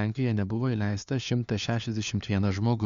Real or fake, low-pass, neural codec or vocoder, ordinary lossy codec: fake; 7.2 kHz; codec, 16 kHz, 4 kbps, FunCodec, trained on LibriTTS, 50 frames a second; Opus, 64 kbps